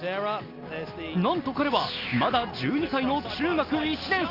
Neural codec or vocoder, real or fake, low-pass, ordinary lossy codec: none; real; 5.4 kHz; Opus, 24 kbps